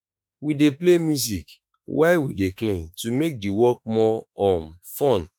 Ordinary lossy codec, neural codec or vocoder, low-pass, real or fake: none; autoencoder, 48 kHz, 32 numbers a frame, DAC-VAE, trained on Japanese speech; none; fake